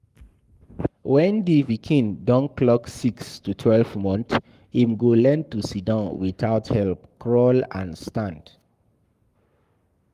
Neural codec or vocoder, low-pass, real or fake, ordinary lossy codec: codec, 44.1 kHz, 7.8 kbps, Pupu-Codec; 14.4 kHz; fake; Opus, 32 kbps